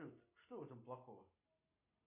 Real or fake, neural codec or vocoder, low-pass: real; none; 3.6 kHz